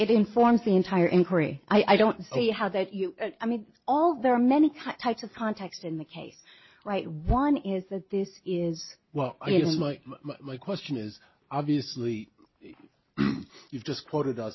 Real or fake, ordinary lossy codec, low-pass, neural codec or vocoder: real; MP3, 24 kbps; 7.2 kHz; none